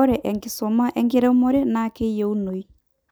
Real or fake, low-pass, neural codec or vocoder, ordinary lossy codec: real; none; none; none